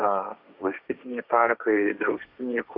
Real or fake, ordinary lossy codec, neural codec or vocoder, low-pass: fake; Opus, 64 kbps; codec, 16 kHz, 1.1 kbps, Voila-Tokenizer; 5.4 kHz